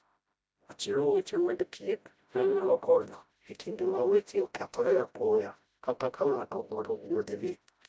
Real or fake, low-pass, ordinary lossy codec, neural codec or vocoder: fake; none; none; codec, 16 kHz, 0.5 kbps, FreqCodec, smaller model